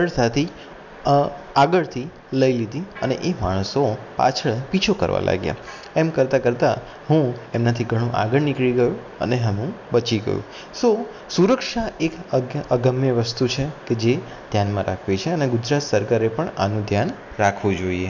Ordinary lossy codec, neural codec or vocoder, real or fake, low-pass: none; none; real; 7.2 kHz